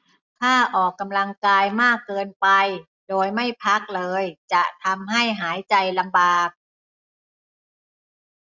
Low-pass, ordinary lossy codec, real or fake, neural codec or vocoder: 7.2 kHz; none; real; none